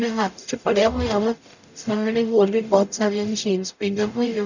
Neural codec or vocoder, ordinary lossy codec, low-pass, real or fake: codec, 44.1 kHz, 0.9 kbps, DAC; none; 7.2 kHz; fake